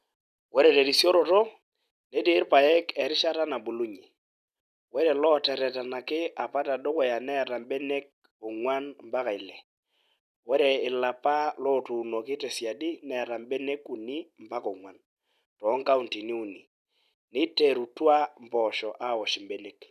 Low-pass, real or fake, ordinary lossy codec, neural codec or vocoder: 14.4 kHz; real; none; none